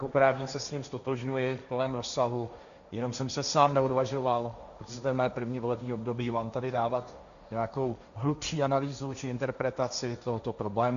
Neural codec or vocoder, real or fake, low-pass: codec, 16 kHz, 1.1 kbps, Voila-Tokenizer; fake; 7.2 kHz